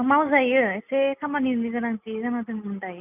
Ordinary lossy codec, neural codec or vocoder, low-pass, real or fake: none; none; 3.6 kHz; real